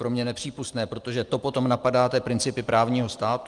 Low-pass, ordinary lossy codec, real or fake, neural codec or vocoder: 10.8 kHz; Opus, 32 kbps; real; none